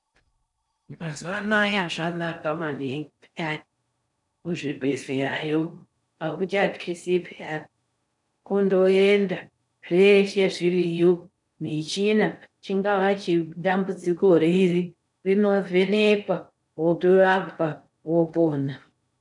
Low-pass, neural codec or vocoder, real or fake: 10.8 kHz; codec, 16 kHz in and 24 kHz out, 0.6 kbps, FocalCodec, streaming, 4096 codes; fake